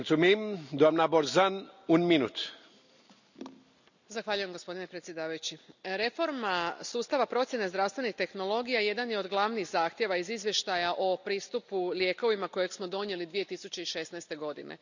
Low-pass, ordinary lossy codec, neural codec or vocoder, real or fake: 7.2 kHz; none; none; real